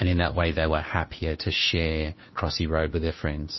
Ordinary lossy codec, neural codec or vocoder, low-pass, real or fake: MP3, 24 kbps; codec, 16 kHz, 2 kbps, FunCodec, trained on Chinese and English, 25 frames a second; 7.2 kHz; fake